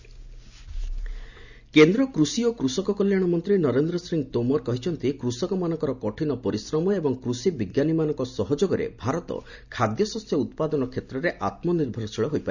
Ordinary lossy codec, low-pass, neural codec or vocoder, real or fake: none; 7.2 kHz; none; real